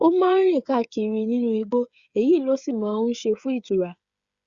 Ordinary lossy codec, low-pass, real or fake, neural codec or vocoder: Opus, 64 kbps; 7.2 kHz; fake; codec, 16 kHz, 8 kbps, FreqCodec, smaller model